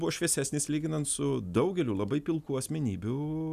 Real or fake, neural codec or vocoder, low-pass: real; none; 14.4 kHz